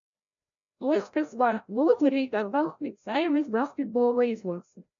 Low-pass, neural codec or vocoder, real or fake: 7.2 kHz; codec, 16 kHz, 0.5 kbps, FreqCodec, larger model; fake